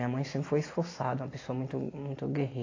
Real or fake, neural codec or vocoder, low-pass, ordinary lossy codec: real; none; 7.2 kHz; AAC, 32 kbps